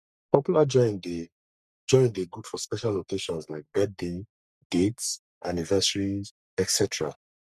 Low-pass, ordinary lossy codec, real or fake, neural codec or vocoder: 14.4 kHz; none; fake; codec, 44.1 kHz, 3.4 kbps, Pupu-Codec